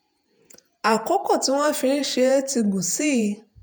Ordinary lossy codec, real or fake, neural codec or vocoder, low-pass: none; fake; vocoder, 48 kHz, 128 mel bands, Vocos; none